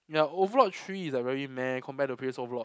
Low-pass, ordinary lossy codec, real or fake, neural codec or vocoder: none; none; real; none